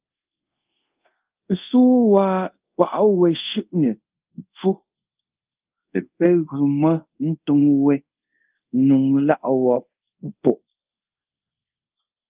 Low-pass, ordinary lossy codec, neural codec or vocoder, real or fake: 3.6 kHz; Opus, 32 kbps; codec, 24 kHz, 0.5 kbps, DualCodec; fake